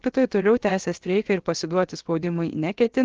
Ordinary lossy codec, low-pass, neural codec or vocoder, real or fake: Opus, 16 kbps; 7.2 kHz; codec, 16 kHz, 0.7 kbps, FocalCodec; fake